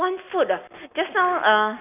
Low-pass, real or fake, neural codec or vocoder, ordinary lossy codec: 3.6 kHz; real; none; none